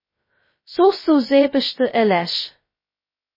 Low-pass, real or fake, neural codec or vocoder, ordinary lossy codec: 5.4 kHz; fake; codec, 16 kHz, 0.2 kbps, FocalCodec; MP3, 24 kbps